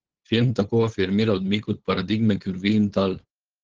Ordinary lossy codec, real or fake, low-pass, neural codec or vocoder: Opus, 16 kbps; fake; 7.2 kHz; codec, 16 kHz, 8 kbps, FunCodec, trained on LibriTTS, 25 frames a second